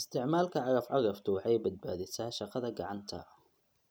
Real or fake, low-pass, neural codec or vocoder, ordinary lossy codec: real; none; none; none